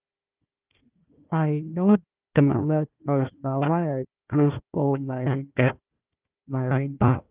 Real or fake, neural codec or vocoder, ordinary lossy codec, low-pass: fake; codec, 16 kHz, 1 kbps, FunCodec, trained on Chinese and English, 50 frames a second; Opus, 32 kbps; 3.6 kHz